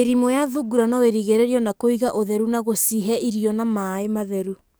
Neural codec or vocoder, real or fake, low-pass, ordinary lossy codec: codec, 44.1 kHz, 7.8 kbps, DAC; fake; none; none